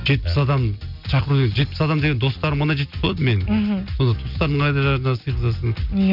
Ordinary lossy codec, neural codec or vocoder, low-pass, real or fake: none; none; 5.4 kHz; real